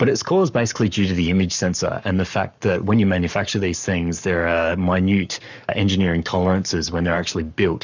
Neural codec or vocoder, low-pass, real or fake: codec, 44.1 kHz, 7.8 kbps, Pupu-Codec; 7.2 kHz; fake